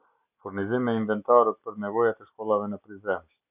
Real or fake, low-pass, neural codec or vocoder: real; 3.6 kHz; none